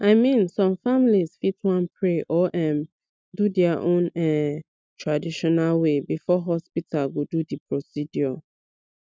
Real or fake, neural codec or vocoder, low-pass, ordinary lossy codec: real; none; none; none